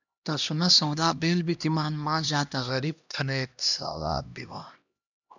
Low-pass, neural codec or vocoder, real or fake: 7.2 kHz; codec, 16 kHz, 1 kbps, X-Codec, HuBERT features, trained on LibriSpeech; fake